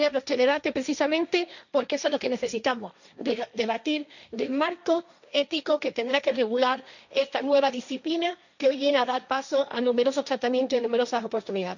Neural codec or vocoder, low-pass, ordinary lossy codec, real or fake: codec, 16 kHz, 1.1 kbps, Voila-Tokenizer; 7.2 kHz; none; fake